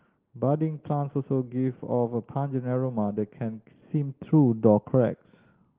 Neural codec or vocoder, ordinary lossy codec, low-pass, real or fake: none; Opus, 16 kbps; 3.6 kHz; real